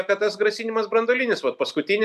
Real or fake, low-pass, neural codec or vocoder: real; 14.4 kHz; none